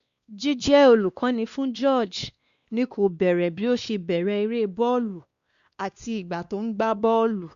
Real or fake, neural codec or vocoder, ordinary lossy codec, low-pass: fake; codec, 16 kHz, 2 kbps, X-Codec, WavLM features, trained on Multilingual LibriSpeech; Opus, 64 kbps; 7.2 kHz